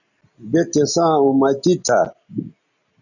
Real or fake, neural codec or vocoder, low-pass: real; none; 7.2 kHz